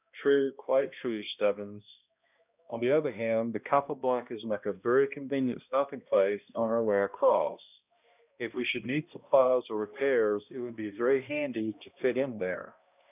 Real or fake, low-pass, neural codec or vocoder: fake; 3.6 kHz; codec, 16 kHz, 1 kbps, X-Codec, HuBERT features, trained on balanced general audio